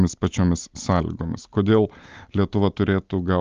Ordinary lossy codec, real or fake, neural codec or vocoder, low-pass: Opus, 32 kbps; real; none; 7.2 kHz